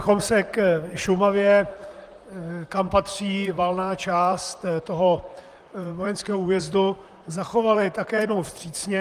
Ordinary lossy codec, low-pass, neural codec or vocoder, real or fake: Opus, 32 kbps; 14.4 kHz; vocoder, 44.1 kHz, 128 mel bands, Pupu-Vocoder; fake